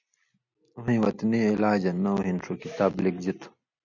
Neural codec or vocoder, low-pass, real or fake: none; 7.2 kHz; real